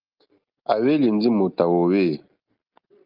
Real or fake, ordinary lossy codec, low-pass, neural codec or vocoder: real; Opus, 32 kbps; 5.4 kHz; none